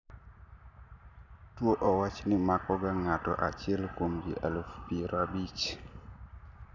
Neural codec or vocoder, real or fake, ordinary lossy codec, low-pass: none; real; none; 7.2 kHz